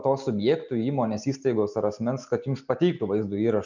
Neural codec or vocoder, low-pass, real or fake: none; 7.2 kHz; real